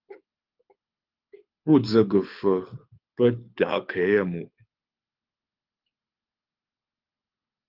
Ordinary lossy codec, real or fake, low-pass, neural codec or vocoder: Opus, 24 kbps; fake; 5.4 kHz; codec, 24 kHz, 6 kbps, HILCodec